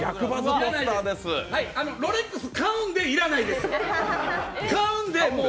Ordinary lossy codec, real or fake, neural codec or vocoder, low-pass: none; real; none; none